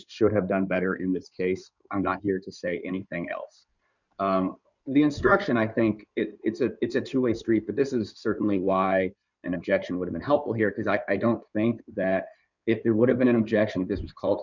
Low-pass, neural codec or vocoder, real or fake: 7.2 kHz; codec, 16 kHz in and 24 kHz out, 2.2 kbps, FireRedTTS-2 codec; fake